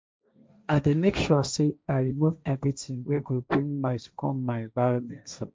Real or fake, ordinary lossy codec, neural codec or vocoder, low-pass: fake; none; codec, 16 kHz, 1.1 kbps, Voila-Tokenizer; none